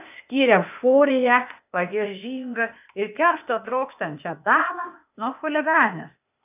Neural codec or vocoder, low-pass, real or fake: codec, 16 kHz, 0.8 kbps, ZipCodec; 3.6 kHz; fake